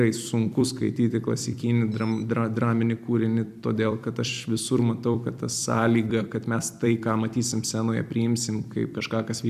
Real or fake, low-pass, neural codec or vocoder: fake; 14.4 kHz; vocoder, 44.1 kHz, 128 mel bands every 256 samples, BigVGAN v2